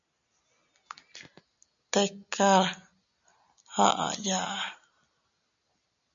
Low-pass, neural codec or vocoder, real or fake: 7.2 kHz; none; real